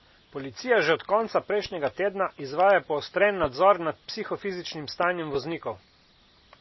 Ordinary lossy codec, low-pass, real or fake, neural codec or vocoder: MP3, 24 kbps; 7.2 kHz; real; none